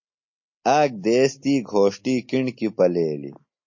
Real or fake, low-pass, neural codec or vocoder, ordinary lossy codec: real; 7.2 kHz; none; MP3, 32 kbps